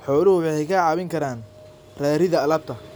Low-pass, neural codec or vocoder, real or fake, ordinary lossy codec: none; none; real; none